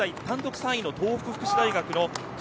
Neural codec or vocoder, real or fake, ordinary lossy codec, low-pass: none; real; none; none